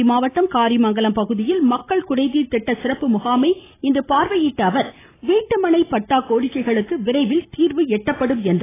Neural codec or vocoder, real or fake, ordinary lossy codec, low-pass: none; real; AAC, 16 kbps; 3.6 kHz